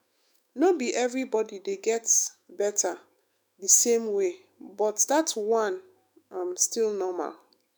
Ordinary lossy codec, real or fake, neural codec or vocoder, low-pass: none; fake; autoencoder, 48 kHz, 128 numbers a frame, DAC-VAE, trained on Japanese speech; none